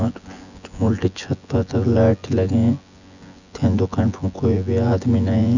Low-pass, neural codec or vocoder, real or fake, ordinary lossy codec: 7.2 kHz; vocoder, 24 kHz, 100 mel bands, Vocos; fake; none